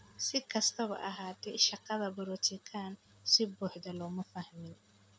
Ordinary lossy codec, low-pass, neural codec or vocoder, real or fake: none; none; none; real